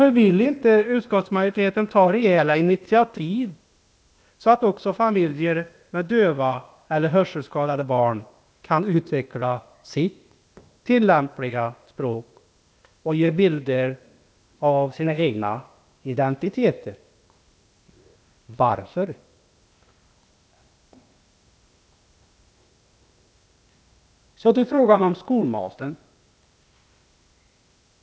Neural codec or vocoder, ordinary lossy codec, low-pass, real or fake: codec, 16 kHz, 0.8 kbps, ZipCodec; none; none; fake